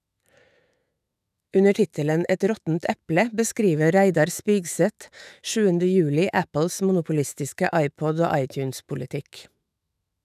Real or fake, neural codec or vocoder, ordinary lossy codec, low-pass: fake; autoencoder, 48 kHz, 128 numbers a frame, DAC-VAE, trained on Japanese speech; none; 14.4 kHz